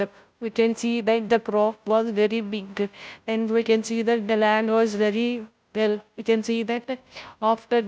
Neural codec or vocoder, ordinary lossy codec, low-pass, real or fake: codec, 16 kHz, 0.5 kbps, FunCodec, trained on Chinese and English, 25 frames a second; none; none; fake